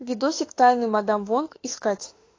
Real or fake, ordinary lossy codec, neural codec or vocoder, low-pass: fake; AAC, 32 kbps; autoencoder, 48 kHz, 32 numbers a frame, DAC-VAE, trained on Japanese speech; 7.2 kHz